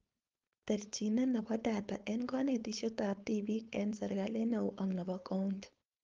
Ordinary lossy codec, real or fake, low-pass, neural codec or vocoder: Opus, 32 kbps; fake; 7.2 kHz; codec, 16 kHz, 4.8 kbps, FACodec